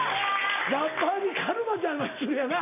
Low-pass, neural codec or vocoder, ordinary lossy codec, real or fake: 3.6 kHz; none; none; real